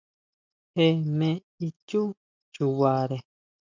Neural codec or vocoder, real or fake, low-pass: none; real; 7.2 kHz